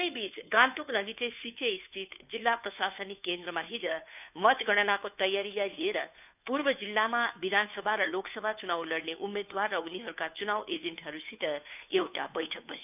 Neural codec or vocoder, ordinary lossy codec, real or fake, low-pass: codec, 16 kHz, 2 kbps, FunCodec, trained on Chinese and English, 25 frames a second; none; fake; 3.6 kHz